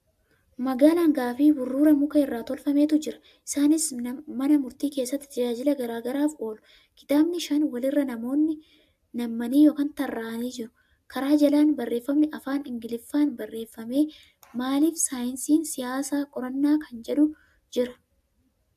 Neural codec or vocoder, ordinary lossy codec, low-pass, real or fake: none; AAC, 96 kbps; 14.4 kHz; real